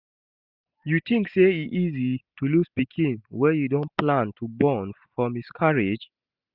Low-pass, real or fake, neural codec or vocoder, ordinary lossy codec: 5.4 kHz; real; none; none